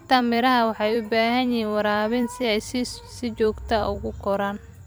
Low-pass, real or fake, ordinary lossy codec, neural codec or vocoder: none; fake; none; vocoder, 44.1 kHz, 128 mel bands every 256 samples, BigVGAN v2